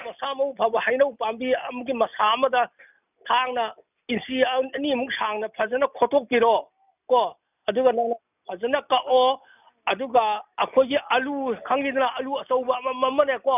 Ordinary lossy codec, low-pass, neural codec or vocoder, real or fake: none; 3.6 kHz; none; real